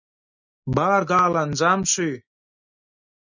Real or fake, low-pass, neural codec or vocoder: real; 7.2 kHz; none